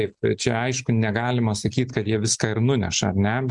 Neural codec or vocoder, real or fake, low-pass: none; real; 10.8 kHz